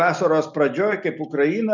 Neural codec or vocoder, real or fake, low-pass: none; real; 7.2 kHz